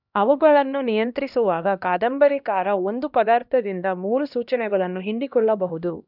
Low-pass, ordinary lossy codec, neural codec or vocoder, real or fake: 5.4 kHz; none; codec, 16 kHz, 1 kbps, X-Codec, HuBERT features, trained on LibriSpeech; fake